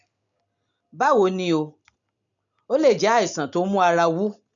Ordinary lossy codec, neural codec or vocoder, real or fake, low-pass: none; none; real; 7.2 kHz